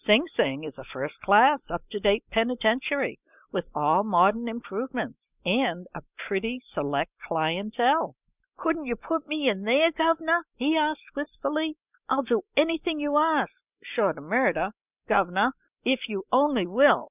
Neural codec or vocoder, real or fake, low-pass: none; real; 3.6 kHz